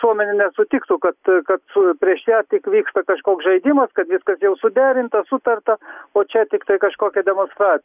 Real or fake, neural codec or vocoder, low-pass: real; none; 3.6 kHz